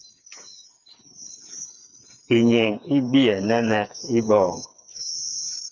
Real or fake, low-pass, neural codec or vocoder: fake; 7.2 kHz; codec, 16 kHz, 4 kbps, FreqCodec, smaller model